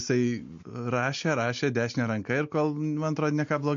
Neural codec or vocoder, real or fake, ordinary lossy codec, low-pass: none; real; MP3, 48 kbps; 7.2 kHz